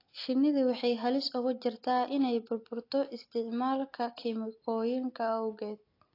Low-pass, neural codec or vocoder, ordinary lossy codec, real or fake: 5.4 kHz; none; AAC, 32 kbps; real